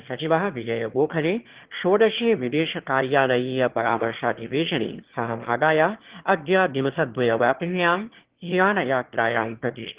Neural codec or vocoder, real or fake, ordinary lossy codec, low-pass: autoencoder, 22.05 kHz, a latent of 192 numbers a frame, VITS, trained on one speaker; fake; Opus, 16 kbps; 3.6 kHz